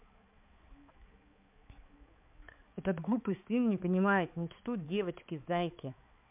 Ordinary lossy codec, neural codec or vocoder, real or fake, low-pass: MP3, 32 kbps; codec, 16 kHz, 4 kbps, X-Codec, HuBERT features, trained on balanced general audio; fake; 3.6 kHz